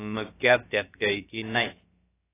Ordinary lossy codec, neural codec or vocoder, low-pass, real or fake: AAC, 16 kbps; codec, 16 kHz, about 1 kbps, DyCAST, with the encoder's durations; 3.6 kHz; fake